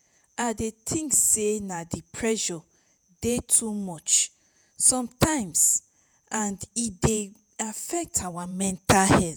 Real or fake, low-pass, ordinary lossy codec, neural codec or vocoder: fake; none; none; vocoder, 48 kHz, 128 mel bands, Vocos